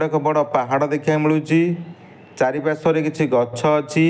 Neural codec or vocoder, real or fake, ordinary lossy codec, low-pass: none; real; none; none